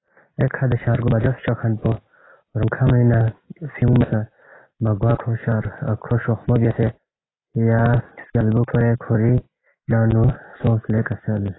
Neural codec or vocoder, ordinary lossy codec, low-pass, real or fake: none; AAC, 16 kbps; 7.2 kHz; real